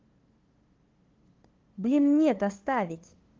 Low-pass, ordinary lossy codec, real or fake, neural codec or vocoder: 7.2 kHz; Opus, 16 kbps; fake; codec, 16 kHz, 2 kbps, FunCodec, trained on LibriTTS, 25 frames a second